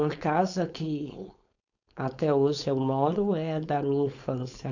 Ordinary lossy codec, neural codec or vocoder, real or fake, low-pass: none; codec, 16 kHz, 4.8 kbps, FACodec; fake; 7.2 kHz